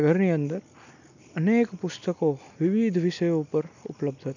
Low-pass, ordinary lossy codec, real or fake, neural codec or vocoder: 7.2 kHz; none; real; none